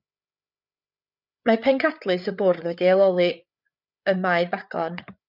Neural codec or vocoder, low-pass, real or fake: codec, 16 kHz, 8 kbps, FreqCodec, larger model; 5.4 kHz; fake